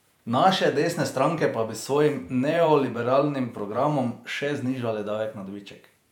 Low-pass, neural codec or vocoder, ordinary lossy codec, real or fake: 19.8 kHz; vocoder, 48 kHz, 128 mel bands, Vocos; none; fake